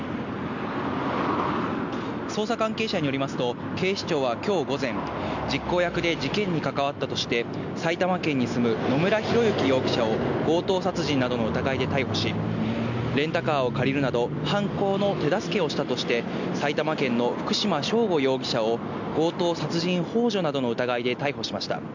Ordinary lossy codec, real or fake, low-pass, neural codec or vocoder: none; real; 7.2 kHz; none